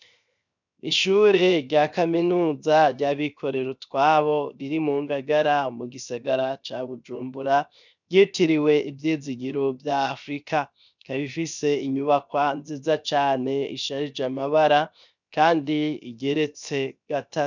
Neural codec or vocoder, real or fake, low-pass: codec, 16 kHz, 0.7 kbps, FocalCodec; fake; 7.2 kHz